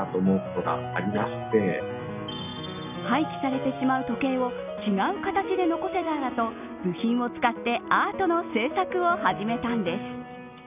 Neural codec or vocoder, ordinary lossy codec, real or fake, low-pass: none; none; real; 3.6 kHz